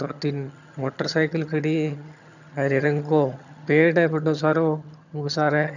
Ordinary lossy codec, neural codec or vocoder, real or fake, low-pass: none; vocoder, 22.05 kHz, 80 mel bands, HiFi-GAN; fake; 7.2 kHz